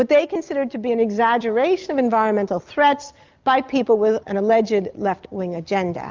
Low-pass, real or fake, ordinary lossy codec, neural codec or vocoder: 7.2 kHz; real; Opus, 24 kbps; none